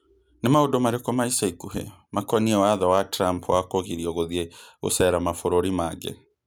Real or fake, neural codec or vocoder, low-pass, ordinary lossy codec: real; none; none; none